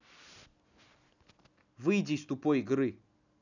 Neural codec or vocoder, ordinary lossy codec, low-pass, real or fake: none; none; 7.2 kHz; real